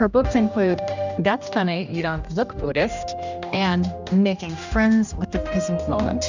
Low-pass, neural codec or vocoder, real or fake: 7.2 kHz; codec, 16 kHz, 1 kbps, X-Codec, HuBERT features, trained on general audio; fake